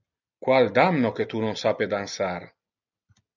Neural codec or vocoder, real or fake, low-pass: none; real; 7.2 kHz